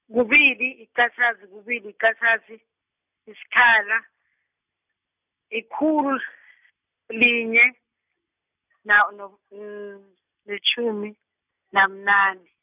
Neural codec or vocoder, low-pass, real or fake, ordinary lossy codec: none; 3.6 kHz; real; none